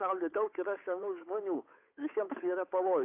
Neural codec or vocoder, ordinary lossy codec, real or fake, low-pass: codec, 16 kHz, 8 kbps, FunCodec, trained on Chinese and English, 25 frames a second; AAC, 32 kbps; fake; 3.6 kHz